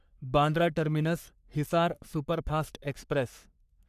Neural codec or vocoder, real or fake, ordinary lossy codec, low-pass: codec, 44.1 kHz, 3.4 kbps, Pupu-Codec; fake; none; 14.4 kHz